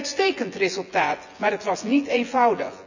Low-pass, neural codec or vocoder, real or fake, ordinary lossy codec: 7.2 kHz; vocoder, 24 kHz, 100 mel bands, Vocos; fake; none